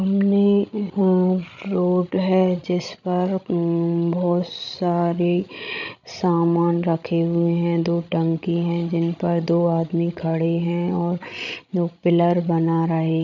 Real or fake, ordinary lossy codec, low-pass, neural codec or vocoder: fake; none; 7.2 kHz; codec, 16 kHz, 16 kbps, FreqCodec, larger model